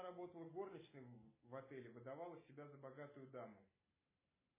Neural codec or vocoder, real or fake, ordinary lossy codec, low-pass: none; real; MP3, 16 kbps; 3.6 kHz